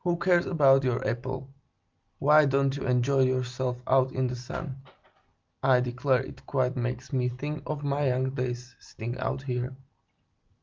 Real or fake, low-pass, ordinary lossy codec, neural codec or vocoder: real; 7.2 kHz; Opus, 32 kbps; none